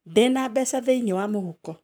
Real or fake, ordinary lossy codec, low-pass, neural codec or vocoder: fake; none; none; codec, 44.1 kHz, 7.8 kbps, Pupu-Codec